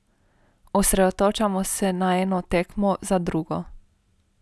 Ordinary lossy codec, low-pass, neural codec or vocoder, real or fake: none; none; none; real